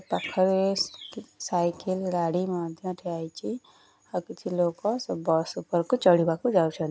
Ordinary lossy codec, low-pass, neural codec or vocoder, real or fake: none; none; none; real